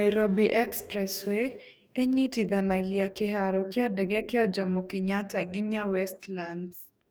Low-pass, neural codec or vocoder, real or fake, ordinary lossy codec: none; codec, 44.1 kHz, 2.6 kbps, DAC; fake; none